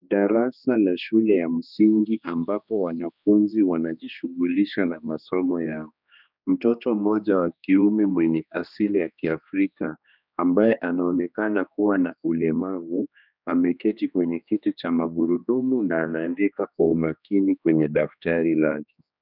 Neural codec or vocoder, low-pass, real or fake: codec, 16 kHz, 2 kbps, X-Codec, HuBERT features, trained on general audio; 5.4 kHz; fake